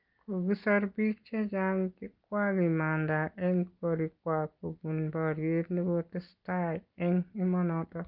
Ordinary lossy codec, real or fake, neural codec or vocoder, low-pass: Opus, 32 kbps; real; none; 5.4 kHz